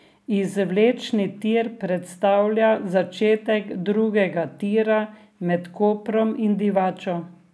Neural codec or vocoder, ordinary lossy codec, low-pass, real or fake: none; none; none; real